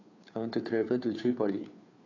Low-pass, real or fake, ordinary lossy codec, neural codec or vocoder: 7.2 kHz; fake; AAC, 32 kbps; codec, 16 kHz, 2 kbps, FunCodec, trained on Chinese and English, 25 frames a second